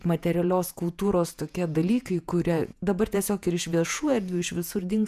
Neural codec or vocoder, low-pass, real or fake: vocoder, 48 kHz, 128 mel bands, Vocos; 14.4 kHz; fake